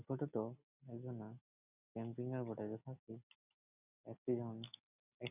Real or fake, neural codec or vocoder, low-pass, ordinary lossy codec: real; none; 3.6 kHz; none